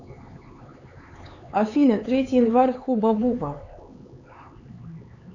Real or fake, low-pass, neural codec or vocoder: fake; 7.2 kHz; codec, 16 kHz, 4 kbps, X-Codec, HuBERT features, trained on LibriSpeech